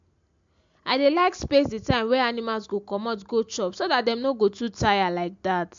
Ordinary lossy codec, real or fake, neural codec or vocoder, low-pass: MP3, 64 kbps; real; none; 7.2 kHz